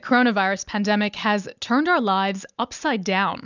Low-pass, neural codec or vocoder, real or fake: 7.2 kHz; none; real